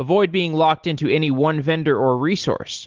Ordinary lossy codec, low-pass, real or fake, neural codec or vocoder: Opus, 16 kbps; 7.2 kHz; real; none